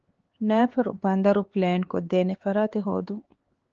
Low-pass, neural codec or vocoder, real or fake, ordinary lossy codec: 7.2 kHz; codec, 16 kHz, 4 kbps, X-Codec, HuBERT features, trained on LibriSpeech; fake; Opus, 16 kbps